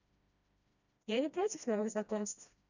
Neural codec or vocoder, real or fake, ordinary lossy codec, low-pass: codec, 16 kHz, 1 kbps, FreqCodec, smaller model; fake; none; 7.2 kHz